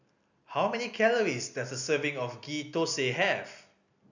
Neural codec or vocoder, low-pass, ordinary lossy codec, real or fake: none; 7.2 kHz; none; real